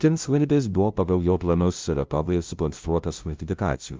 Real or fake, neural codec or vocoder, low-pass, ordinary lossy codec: fake; codec, 16 kHz, 0.5 kbps, FunCodec, trained on LibriTTS, 25 frames a second; 7.2 kHz; Opus, 32 kbps